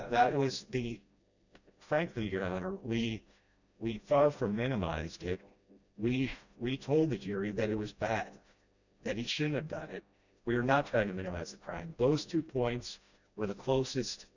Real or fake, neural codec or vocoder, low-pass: fake; codec, 16 kHz, 1 kbps, FreqCodec, smaller model; 7.2 kHz